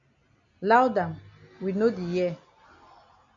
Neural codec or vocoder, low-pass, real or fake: none; 7.2 kHz; real